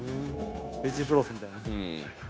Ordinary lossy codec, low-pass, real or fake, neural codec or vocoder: none; none; fake; codec, 16 kHz, 0.9 kbps, LongCat-Audio-Codec